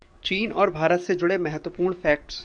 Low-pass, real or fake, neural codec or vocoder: 9.9 kHz; fake; codec, 44.1 kHz, 7.8 kbps, DAC